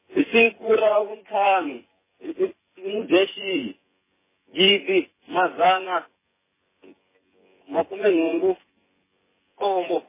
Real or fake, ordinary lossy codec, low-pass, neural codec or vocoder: fake; MP3, 16 kbps; 3.6 kHz; vocoder, 24 kHz, 100 mel bands, Vocos